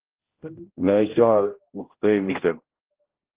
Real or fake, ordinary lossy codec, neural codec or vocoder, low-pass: fake; Opus, 16 kbps; codec, 16 kHz, 0.5 kbps, X-Codec, HuBERT features, trained on general audio; 3.6 kHz